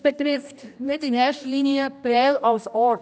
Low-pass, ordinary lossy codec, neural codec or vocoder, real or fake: none; none; codec, 16 kHz, 1 kbps, X-Codec, HuBERT features, trained on general audio; fake